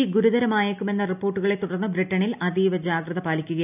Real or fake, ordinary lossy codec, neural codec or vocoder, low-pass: real; none; none; 3.6 kHz